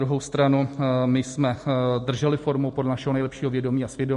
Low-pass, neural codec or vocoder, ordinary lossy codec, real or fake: 14.4 kHz; none; MP3, 48 kbps; real